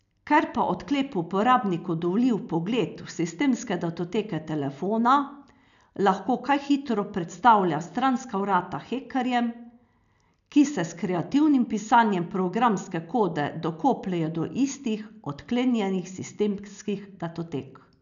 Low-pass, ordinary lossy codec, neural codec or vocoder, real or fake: 7.2 kHz; none; none; real